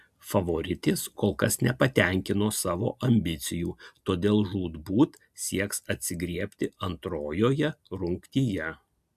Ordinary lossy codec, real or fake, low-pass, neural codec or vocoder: AAC, 96 kbps; real; 14.4 kHz; none